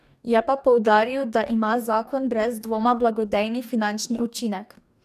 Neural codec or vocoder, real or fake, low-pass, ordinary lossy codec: codec, 44.1 kHz, 2.6 kbps, DAC; fake; 14.4 kHz; none